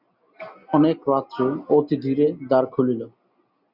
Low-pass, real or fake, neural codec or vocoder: 5.4 kHz; real; none